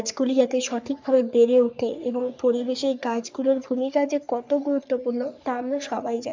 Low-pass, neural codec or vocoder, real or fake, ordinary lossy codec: 7.2 kHz; codec, 44.1 kHz, 3.4 kbps, Pupu-Codec; fake; MP3, 64 kbps